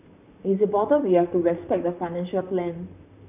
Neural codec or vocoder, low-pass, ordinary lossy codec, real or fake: vocoder, 44.1 kHz, 128 mel bands, Pupu-Vocoder; 3.6 kHz; none; fake